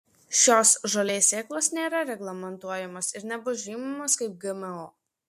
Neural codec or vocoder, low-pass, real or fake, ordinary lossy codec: none; 14.4 kHz; real; MP3, 64 kbps